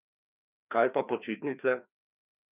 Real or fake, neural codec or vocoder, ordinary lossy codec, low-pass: fake; codec, 16 kHz, 2 kbps, FreqCodec, larger model; none; 3.6 kHz